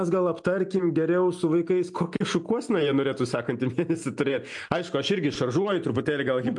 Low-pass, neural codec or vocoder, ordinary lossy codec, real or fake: 10.8 kHz; none; MP3, 64 kbps; real